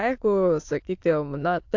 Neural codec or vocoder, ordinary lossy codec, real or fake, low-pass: autoencoder, 22.05 kHz, a latent of 192 numbers a frame, VITS, trained on many speakers; AAC, 48 kbps; fake; 7.2 kHz